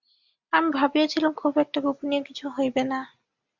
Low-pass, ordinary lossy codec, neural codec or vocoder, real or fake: 7.2 kHz; Opus, 64 kbps; none; real